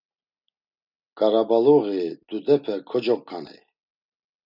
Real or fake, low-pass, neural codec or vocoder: real; 5.4 kHz; none